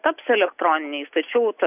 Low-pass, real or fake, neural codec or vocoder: 3.6 kHz; real; none